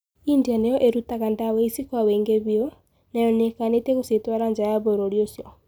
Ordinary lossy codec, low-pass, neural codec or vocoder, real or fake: none; none; none; real